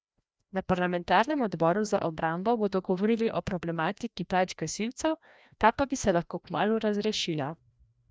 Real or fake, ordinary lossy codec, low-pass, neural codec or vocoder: fake; none; none; codec, 16 kHz, 1 kbps, FreqCodec, larger model